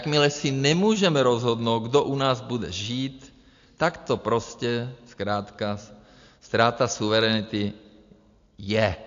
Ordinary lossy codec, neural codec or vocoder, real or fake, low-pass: AAC, 64 kbps; none; real; 7.2 kHz